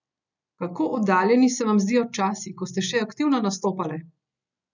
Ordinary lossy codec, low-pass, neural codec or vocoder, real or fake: none; 7.2 kHz; none; real